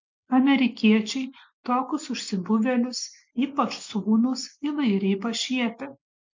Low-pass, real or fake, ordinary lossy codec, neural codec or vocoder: 7.2 kHz; real; MP3, 64 kbps; none